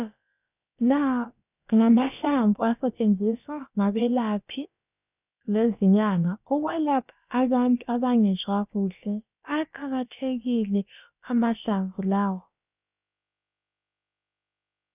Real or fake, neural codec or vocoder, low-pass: fake; codec, 16 kHz, about 1 kbps, DyCAST, with the encoder's durations; 3.6 kHz